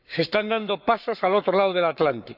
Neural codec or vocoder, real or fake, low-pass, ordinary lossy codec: codec, 44.1 kHz, 7.8 kbps, Pupu-Codec; fake; 5.4 kHz; none